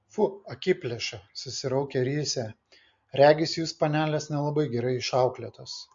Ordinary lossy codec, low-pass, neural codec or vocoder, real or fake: MP3, 48 kbps; 7.2 kHz; none; real